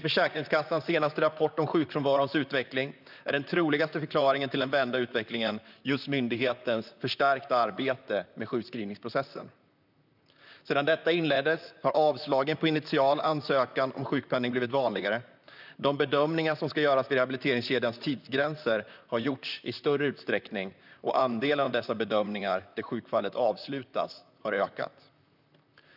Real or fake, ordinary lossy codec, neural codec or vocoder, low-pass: fake; none; vocoder, 44.1 kHz, 128 mel bands, Pupu-Vocoder; 5.4 kHz